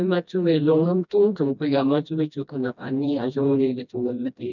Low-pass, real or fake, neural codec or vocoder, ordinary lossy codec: 7.2 kHz; fake; codec, 16 kHz, 1 kbps, FreqCodec, smaller model; none